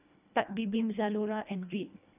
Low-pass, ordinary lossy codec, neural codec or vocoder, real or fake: 3.6 kHz; none; codec, 24 kHz, 1.5 kbps, HILCodec; fake